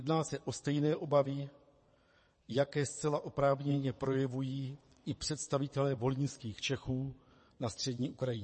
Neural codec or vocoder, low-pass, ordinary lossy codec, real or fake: vocoder, 22.05 kHz, 80 mel bands, WaveNeXt; 9.9 kHz; MP3, 32 kbps; fake